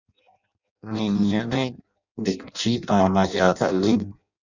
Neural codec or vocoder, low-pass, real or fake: codec, 16 kHz in and 24 kHz out, 0.6 kbps, FireRedTTS-2 codec; 7.2 kHz; fake